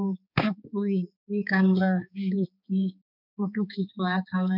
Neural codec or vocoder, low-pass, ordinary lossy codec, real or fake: codec, 16 kHz, 4 kbps, X-Codec, HuBERT features, trained on balanced general audio; 5.4 kHz; none; fake